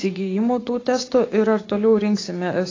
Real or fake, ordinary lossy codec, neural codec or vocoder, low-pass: fake; AAC, 32 kbps; codec, 16 kHz, 6 kbps, DAC; 7.2 kHz